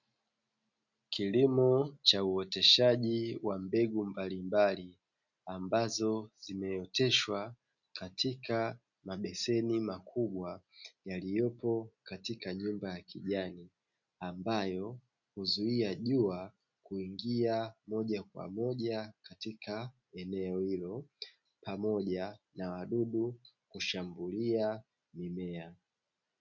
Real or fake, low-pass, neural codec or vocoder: real; 7.2 kHz; none